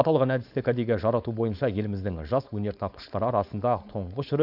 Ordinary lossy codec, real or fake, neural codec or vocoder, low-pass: none; fake; codec, 16 kHz, 4.8 kbps, FACodec; 5.4 kHz